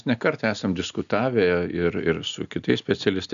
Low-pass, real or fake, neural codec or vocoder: 7.2 kHz; real; none